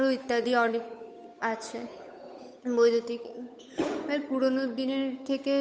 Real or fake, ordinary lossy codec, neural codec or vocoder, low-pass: fake; none; codec, 16 kHz, 8 kbps, FunCodec, trained on Chinese and English, 25 frames a second; none